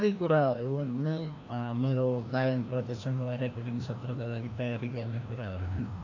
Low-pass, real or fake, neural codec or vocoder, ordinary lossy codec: 7.2 kHz; fake; codec, 16 kHz, 1 kbps, FreqCodec, larger model; AAC, 48 kbps